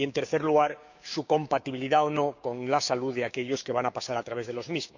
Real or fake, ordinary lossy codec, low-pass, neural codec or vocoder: fake; none; 7.2 kHz; codec, 16 kHz, 6 kbps, DAC